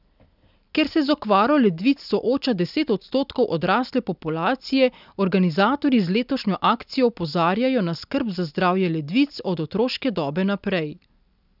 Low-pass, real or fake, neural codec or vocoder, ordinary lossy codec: 5.4 kHz; real; none; none